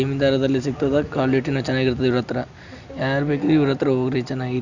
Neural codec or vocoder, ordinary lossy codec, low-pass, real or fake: none; none; 7.2 kHz; real